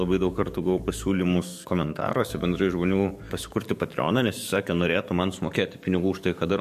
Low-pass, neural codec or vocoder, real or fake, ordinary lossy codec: 14.4 kHz; codec, 44.1 kHz, 7.8 kbps, DAC; fake; MP3, 64 kbps